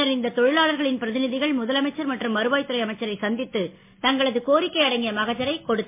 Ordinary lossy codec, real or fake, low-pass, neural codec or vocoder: MP3, 24 kbps; real; 3.6 kHz; none